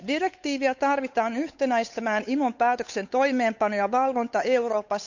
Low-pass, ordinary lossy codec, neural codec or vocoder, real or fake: 7.2 kHz; none; codec, 16 kHz, 8 kbps, FunCodec, trained on Chinese and English, 25 frames a second; fake